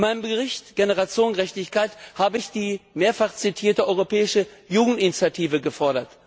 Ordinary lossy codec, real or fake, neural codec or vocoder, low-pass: none; real; none; none